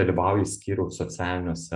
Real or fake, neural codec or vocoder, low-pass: real; none; 10.8 kHz